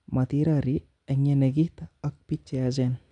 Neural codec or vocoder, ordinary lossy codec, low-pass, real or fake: none; none; 9.9 kHz; real